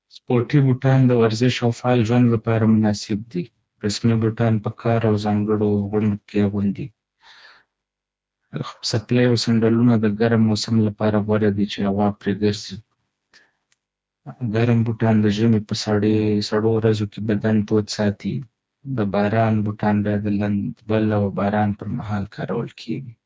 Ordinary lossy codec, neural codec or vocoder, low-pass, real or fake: none; codec, 16 kHz, 2 kbps, FreqCodec, smaller model; none; fake